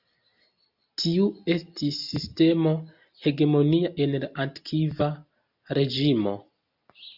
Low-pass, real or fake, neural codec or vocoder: 5.4 kHz; real; none